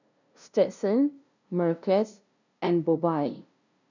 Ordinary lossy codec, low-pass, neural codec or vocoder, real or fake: none; 7.2 kHz; codec, 16 kHz, 0.5 kbps, FunCodec, trained on LibriTTS, 25 frames a second; fake